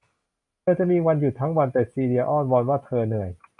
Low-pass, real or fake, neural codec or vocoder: 10.8 kHz; real; none